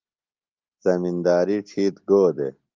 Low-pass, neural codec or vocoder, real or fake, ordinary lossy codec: 7.2 kHz; none; real; Opus, 32 kbps